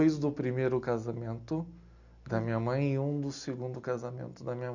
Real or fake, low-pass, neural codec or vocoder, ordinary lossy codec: real; 7.2 kHz; none; none